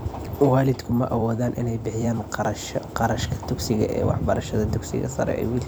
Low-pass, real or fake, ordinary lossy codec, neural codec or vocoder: none; fake; none; vocoder, 44.1 kHz, 128 mel bands every 256 samples, BigVGAN v2